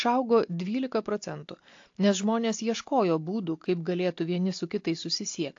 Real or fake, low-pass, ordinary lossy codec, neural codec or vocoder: real; 7.2 kHz; AAC, 48 kbps; none